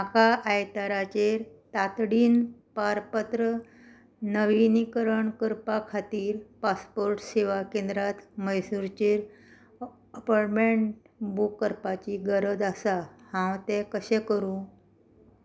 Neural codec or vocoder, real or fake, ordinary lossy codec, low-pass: none; real; none; none